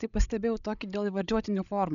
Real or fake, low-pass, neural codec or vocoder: fake; 7.2 kHz; codec, 16 kHz, 16 kbps, FreqCodec, larger model